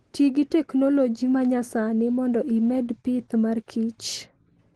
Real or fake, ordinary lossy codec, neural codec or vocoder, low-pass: real; Opus, 16 kbps; none; 10.8 kHz